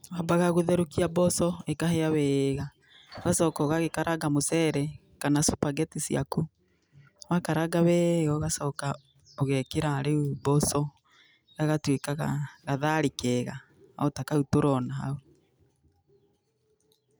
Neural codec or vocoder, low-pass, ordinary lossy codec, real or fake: none; none; none; real